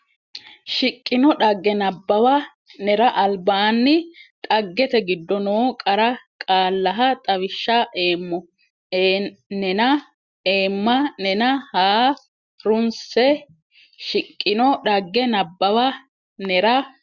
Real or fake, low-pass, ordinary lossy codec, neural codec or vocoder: real; 7.2 kHz; Opus, 64 kbps; none